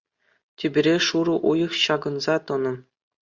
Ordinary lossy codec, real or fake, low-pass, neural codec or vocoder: Opus, 64 kbps; real; 7.2 kHz; none